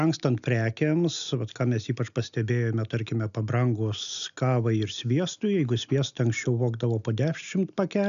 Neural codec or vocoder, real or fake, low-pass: none; real; 7.2 kHz